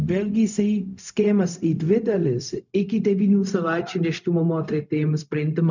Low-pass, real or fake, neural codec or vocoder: 7.2 kHz; fake; codec, 16 kHz, 0.4 kbps, LongCat-Audio-Codec